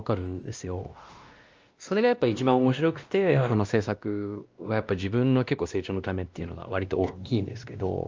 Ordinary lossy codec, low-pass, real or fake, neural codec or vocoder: Opus, 24 kbps; 7.2 kHz; fake; codec, 16 kHz, 1 kbps, X-Codec, WavLM features, trained on Multilingual LibriSpeech